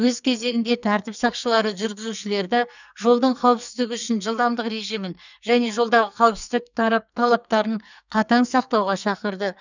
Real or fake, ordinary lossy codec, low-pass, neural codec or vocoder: fake; none; 7.2 kHz; codec, 44.1 kHz, 2.6 kbps, SNAC